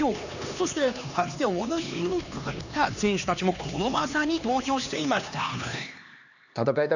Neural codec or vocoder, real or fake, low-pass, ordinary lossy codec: codec, 16 kHz, 2 kbps, X-Codec, HuBERT features, trained on LibriSpeech; fake; 7.2 kHz; none